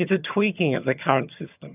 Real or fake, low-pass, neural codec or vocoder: fake; 3.6 kHz; vocoder, 22.05 kHz, 80 mel bands, HiFi-GAN